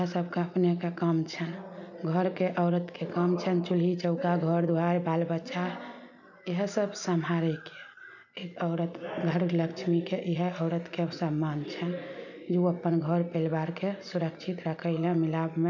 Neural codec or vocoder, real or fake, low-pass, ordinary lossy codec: none; real; 7.2 kHz; none